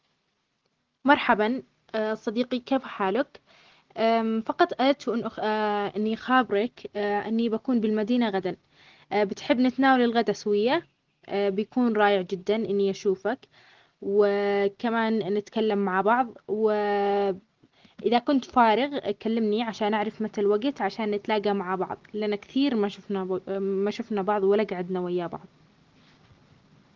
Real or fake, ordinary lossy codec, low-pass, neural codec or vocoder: real; Opus, 16 kbps; 7.2 kHz; none